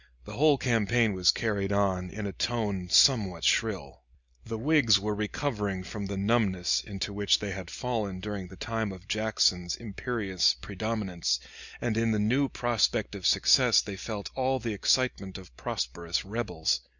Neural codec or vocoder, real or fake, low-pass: none; real; 7.2 kHz